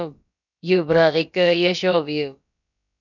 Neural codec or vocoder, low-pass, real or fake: codec, 16 kHz, about 1 kbps, DyCAST, with the encoder's durations; 7.2 kHz; fake